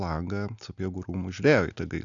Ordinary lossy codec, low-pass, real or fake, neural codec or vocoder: MP3, 96 kbps; 7.2 kHz; real; none